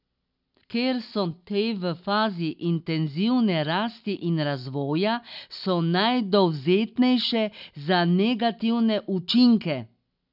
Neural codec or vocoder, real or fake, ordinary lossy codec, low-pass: none; real; none; 5.4 kHz